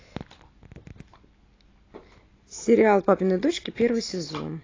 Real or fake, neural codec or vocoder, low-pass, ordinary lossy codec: real; none; 7.2 kHz; AAC, 32 kbps